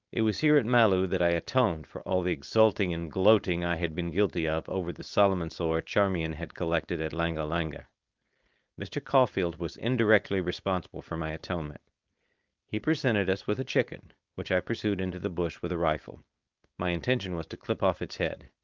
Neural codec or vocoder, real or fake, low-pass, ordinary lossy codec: codec, 16 kHz, 4.8 kbps, FACodec; fake; 7.2 kHz; Opus, 24 kbps